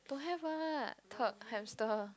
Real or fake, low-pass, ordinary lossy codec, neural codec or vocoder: real; none; none; none